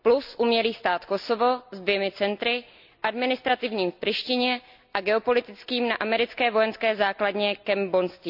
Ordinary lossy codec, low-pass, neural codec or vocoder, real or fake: none; 5.4 kHz; none; real